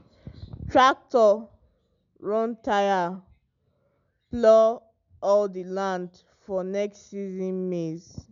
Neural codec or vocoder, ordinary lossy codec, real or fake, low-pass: none; none; real; 7.2 kHz